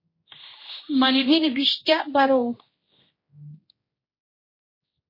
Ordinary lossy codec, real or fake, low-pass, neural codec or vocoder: MP3, 24 kbps; fake; 5.4 kHz; codec, 16 kHz, 1 kbps, X-Codec, HuBERT features, trained on general audio